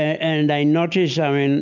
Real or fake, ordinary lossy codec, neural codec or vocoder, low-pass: real; MP3, 64 kbps; none; 7.2 kHz